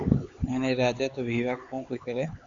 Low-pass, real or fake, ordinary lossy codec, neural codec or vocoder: 7.2 kHz; fake; Opus, 64 kbps; codec, 16 kHz, 4 kbps, X-Codec, HuBERT features, trained on LibriSpeech